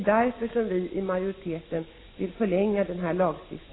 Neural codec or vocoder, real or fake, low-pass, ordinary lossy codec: none; real; 7.2 kHz; AAC, 16 kbps